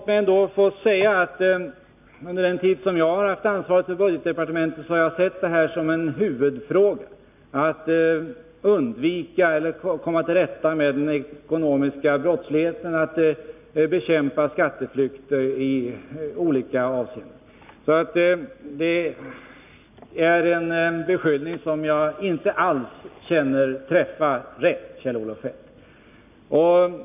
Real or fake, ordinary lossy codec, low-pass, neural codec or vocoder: real; none; 3.6 kHz; none